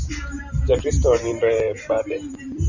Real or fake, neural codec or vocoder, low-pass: fake; vocoder, 44.1 kHz, 128 mel bands every 512 samples, BigVGAN v2; 7.2 kHz